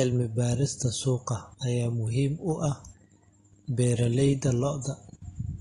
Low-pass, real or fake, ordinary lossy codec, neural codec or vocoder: 10.8 kHz; real; AAC, 32 kbps; none